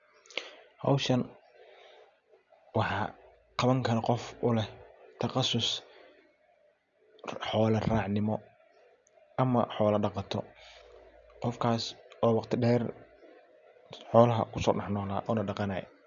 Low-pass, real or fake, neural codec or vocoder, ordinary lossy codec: 7.2 kHz; real; none; none